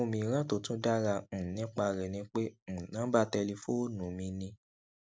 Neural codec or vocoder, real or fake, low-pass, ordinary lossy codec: none; real; none; none